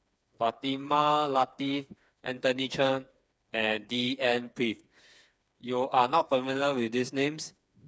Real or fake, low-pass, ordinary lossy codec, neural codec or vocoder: fake; none; none; codec, 16 kHz, 4 kbps, FreqCodec, smaller model